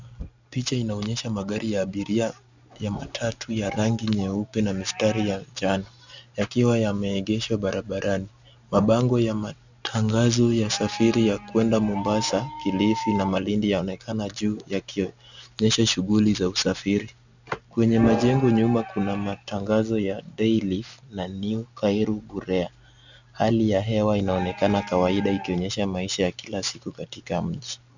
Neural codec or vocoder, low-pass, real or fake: none; 7.2 kHz; real